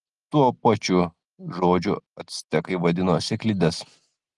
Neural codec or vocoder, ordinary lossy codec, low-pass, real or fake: none; Opus, 24 kbps; 10.8 kHz; real